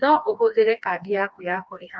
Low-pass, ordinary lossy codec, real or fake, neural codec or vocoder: none; none; fake; codec, 16 kHz, 2 kbps, FreqCodec, smaller model